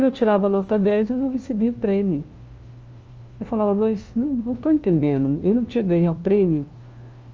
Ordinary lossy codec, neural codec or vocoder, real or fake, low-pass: Opus, 24 kbps; codec, 16 kHz, 1 kbps, FunCodec, trained on LibriTTS, 50 frames a second; fake; 7.2 kHz